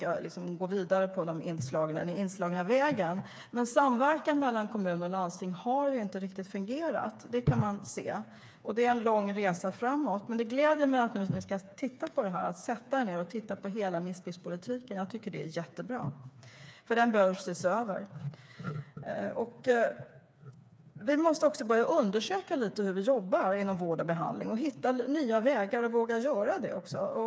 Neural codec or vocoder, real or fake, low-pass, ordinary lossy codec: codec, 16 kHz, 4 kbps, FreqCodec, smaller model; fake; none; none